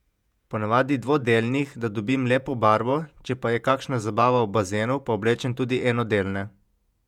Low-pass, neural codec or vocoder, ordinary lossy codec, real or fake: 19.8 kHz; vocoder, 48 kHz, 128 mel bands, Vocos; none; fake